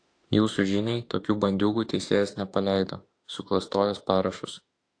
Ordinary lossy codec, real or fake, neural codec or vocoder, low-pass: AAC, 48 kbps; fake; autoencoder, 48 kHz, 32 numbers a frame, DAC-VAE, trained on Japanese speech; 9.9 kHz